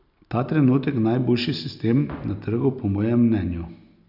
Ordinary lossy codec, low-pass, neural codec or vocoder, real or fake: none; 5.4 kHz; none; real